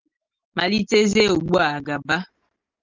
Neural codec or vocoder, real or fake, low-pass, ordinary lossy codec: none; real; 7.2 kHz; Opus, 32 kbps